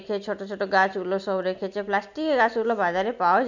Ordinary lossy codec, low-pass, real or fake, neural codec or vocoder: none; 7.2 kHz; real; none